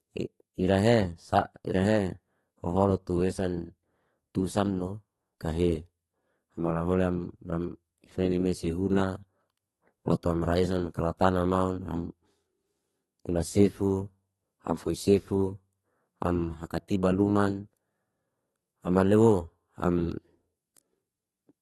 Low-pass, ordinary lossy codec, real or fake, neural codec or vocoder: 14.4 kHz; AAC, 32 kbps; fake; codec, 32 kHz, 1.9 kbps, SNAC